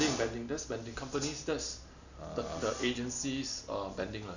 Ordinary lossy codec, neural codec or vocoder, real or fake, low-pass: none; none; real; 7.2 kHz